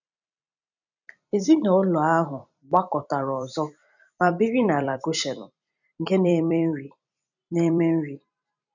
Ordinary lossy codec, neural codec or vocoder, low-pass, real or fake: none; vocoder, 44.1 kHz, 128 mel bands every 256 samples, BigVGAN v2; 7.2 kHz; fake